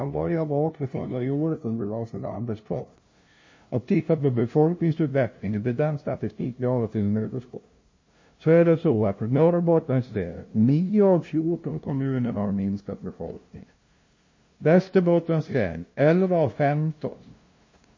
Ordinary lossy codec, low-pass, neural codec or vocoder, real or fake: MP3, 32 kbps; 7.2 kHz; codec, 16 kHz, 0.5 kbps, FunCodec, trained on LibriTTS, 25 frames a second; fake